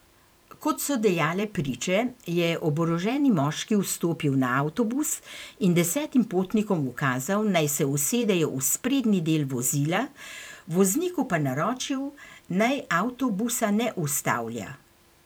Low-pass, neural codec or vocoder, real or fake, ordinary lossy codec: none; vocoder, 44.1 kHz, 128 mel bands every 512 samples, BigVGAN v2; fake; none